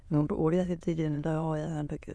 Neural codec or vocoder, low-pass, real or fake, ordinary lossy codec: autoencoder, 22.05 kHz, a latent of 192 numbers a frame, VITS, trained on many speakers; none; fake; none